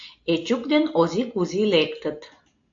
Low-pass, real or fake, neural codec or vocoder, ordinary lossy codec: 7.2 kHz; real; none; AAC, 48 kbps